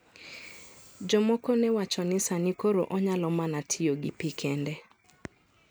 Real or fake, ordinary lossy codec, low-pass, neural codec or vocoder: real; none; none; none